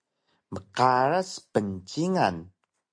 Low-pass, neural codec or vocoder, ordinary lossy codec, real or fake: 9.9 kHz; none; MP3, 48 kbps; real